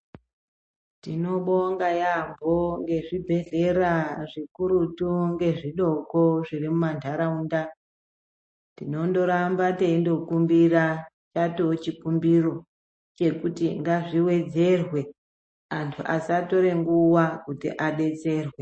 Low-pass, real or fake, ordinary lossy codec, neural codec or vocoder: 9.9 kHz; real; MP3, 32 kbps; none